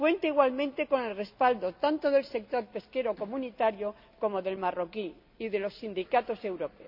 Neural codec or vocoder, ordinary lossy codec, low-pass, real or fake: none; none; 5.4 kHz; real